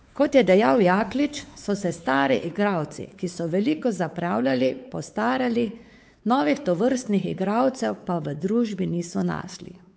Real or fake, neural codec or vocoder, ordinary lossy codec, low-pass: fake; codec, 16 kHz, 4 kbps, X-Codec, WavLM features, trained on Multilingual LibriSpeech; none; none